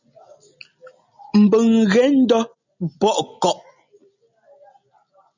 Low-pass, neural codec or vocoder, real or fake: 7.2 kHz; none; real